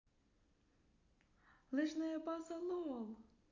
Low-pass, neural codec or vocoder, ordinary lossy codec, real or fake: 7.2 kHz; none; none; real